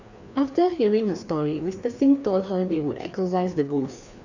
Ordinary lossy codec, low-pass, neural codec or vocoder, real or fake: none; 7.2 kHz; codec, 16 kHz, 2 kbps, FreqCodec, larger model; fake